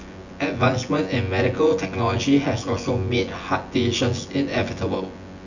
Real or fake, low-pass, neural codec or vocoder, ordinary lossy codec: fake; 7.2 kHz; vocoder, 24 kHz, 100 mel bands, Vocos; none